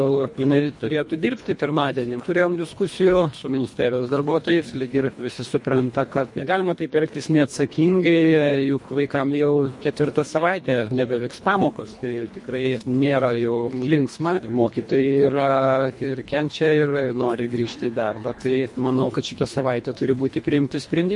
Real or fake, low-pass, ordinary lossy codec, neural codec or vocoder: fake; 10.8 kHz; MP3, 48 kbps; codec, 24 kHz, 1.5 kbps, HILCodec